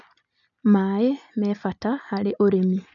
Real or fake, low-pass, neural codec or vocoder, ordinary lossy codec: real; 7.2 kHz; none; none